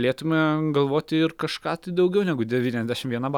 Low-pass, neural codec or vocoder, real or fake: 19.8 kHz; none; real